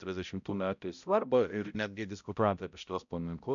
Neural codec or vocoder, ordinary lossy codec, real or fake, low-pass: codec, 16 kHz, 0.5 kbps, X-Codec, HuBERT features, trained on balanced general audio; MP3, 96 kbps; fake; 7.2 kHz